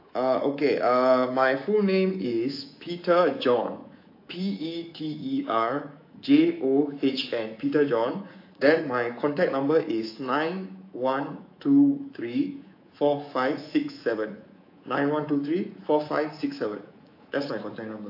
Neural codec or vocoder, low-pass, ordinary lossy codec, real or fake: codec, 24 kHz, 3.1 kbps, DualCodec; 5.4 kHz; AAC, 32 kbps; fake